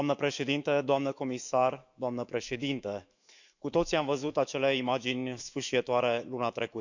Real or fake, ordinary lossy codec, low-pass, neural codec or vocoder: fake; none; 7.2 kHz; autoencoder, 48 kHz, 128 numbers a frame, DAC-VAE, trained on Japanese speech